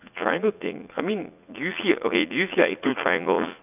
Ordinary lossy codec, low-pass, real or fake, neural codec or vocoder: none; 3.6 kHz; fake; vocoder, 44.1 kHz, 80 mel bands, Vocos